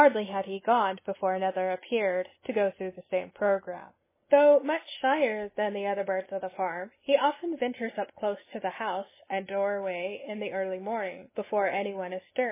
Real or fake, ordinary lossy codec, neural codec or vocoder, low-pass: real; MP3, 16 kbps; none; 3.6 kHz